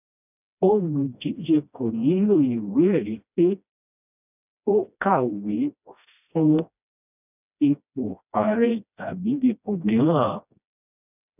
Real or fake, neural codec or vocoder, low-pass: fake; codec, 16 kHz, 1 kbps, FreqCodec, smaller model; 3.6 kHz